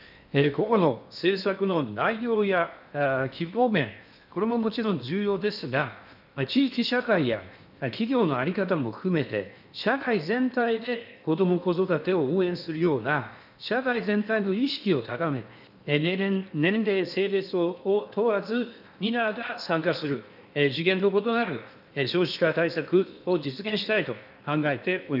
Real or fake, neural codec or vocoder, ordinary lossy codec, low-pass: fake; codec, 16 kHz in and 24 kHz out, 0.8 kbps, FocalCodec, streaming, 65536 codes; none; 5.4 kHz